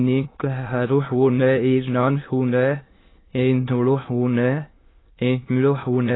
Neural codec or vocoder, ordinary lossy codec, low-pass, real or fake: autoencoder, 22.05 kHz, a latent of 192 numbers a frame, VITS, trained on many speakers; AAC, 16 kbps; 7.2 kHz; fake